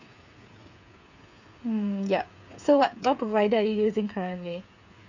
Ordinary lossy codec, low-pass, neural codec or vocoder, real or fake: none; 7.2 kHz; codec, 16 kHz, 8 kbps, FreqCodec, smaller model; fake